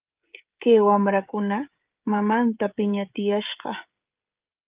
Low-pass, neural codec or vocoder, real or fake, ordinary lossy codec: 3.6 kHz; codec, 16 kHz, 16 kbps, FreqCodec, smaller model; fake; Opus, 32 kbps